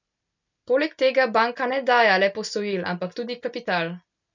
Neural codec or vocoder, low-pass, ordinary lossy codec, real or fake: none; 7.2 kHz; none; real